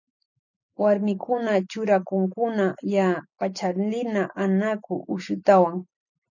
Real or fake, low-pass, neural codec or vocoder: fake; 7.2 kHz; vocoder, 44.1 kHz, 128 mel bands every 256 samples, BigVGAN v2